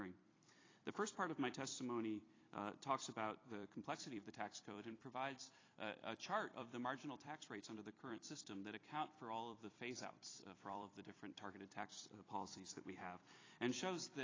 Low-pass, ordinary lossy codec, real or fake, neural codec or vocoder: 7.2 kHz; AAC, 32 kbps; fake; vocoder, 44.1 kHz, 128 mel bands every 256 samples, BigVGAN v2